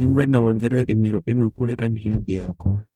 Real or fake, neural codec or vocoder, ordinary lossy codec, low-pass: fake; codec, 44.1 kHz, 0.9 kbps, DAC; none; 19.8 kHz